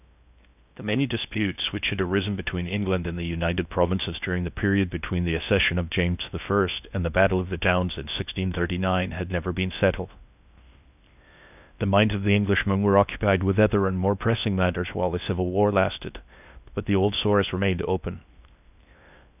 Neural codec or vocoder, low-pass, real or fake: codec, 16 kHz in and 24 kHz out, 0.6 kbps, FocalCodec, streaming, 2048 codes; 3.6 kHz; fake